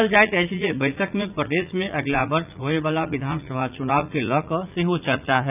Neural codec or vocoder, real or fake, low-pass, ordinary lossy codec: vocoder, 44.1 kHz, 80 mel bands, Vocos; fake; 3.6 kHz; none